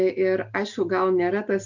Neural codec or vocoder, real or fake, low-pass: none; real; 7.2 kHz